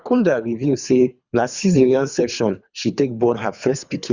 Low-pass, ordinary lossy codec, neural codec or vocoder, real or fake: 7.2 kHz; Opus, 64 kbps; codec, 24 kHz, 3 kbps, HILCodec; fake